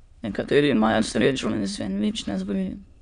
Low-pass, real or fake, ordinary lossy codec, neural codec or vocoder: 9.9 kHz; fake; none; autoencoder, 22.05 kHz, a latent of 192 numbers a frame, VITS, trained on many speakers